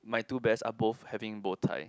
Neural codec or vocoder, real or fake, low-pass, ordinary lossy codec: none; real; none; none